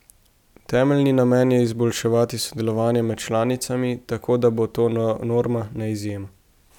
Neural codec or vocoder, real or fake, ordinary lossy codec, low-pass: none; real; none; 19.8 kHz